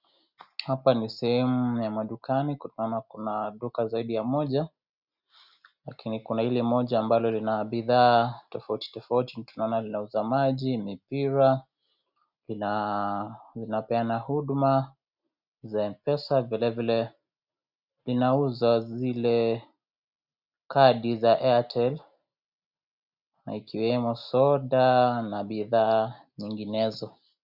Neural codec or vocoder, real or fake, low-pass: none; real; 5.4 kHz